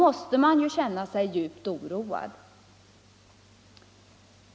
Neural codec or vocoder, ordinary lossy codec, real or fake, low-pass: none; none; real; none